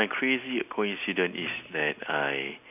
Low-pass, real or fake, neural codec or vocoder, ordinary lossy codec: 3.6 kHz; real; none; none